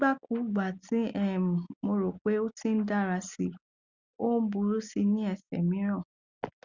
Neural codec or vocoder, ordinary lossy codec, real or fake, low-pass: none; Opus, 64 kbps; real; 7.2 kHz